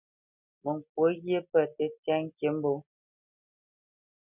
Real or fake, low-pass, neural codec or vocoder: real; 3.6 kHz; none